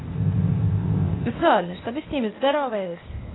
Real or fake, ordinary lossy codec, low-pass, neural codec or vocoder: fake; AAC, 16 kbps; 7.2 kHz; codec, 16 kHz, 0.8 kbps, ZipCodec